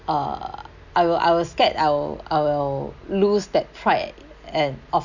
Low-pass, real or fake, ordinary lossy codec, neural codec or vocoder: 7.2 kHz; real; none; none